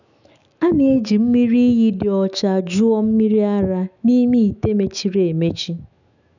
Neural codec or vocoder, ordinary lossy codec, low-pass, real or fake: autoencoder, 48 kHz, 128 numbers a frame, DAC-VAE, trained on Japanese speech; none; 7.2 kHz; fake